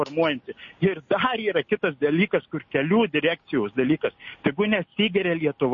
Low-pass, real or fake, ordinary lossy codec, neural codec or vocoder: 7.2 kHz; real; MP3, 32 kbps; none